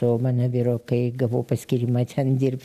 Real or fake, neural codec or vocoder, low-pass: real; none; 14.4 kHz